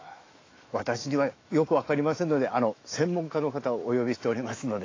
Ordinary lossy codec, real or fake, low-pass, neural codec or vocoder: AAC, 32 kbps; fake; 7.2 kHz; autoencoder, 48 kHz, 128 numbers a frame, DAC-VAE, trained on Japanese speech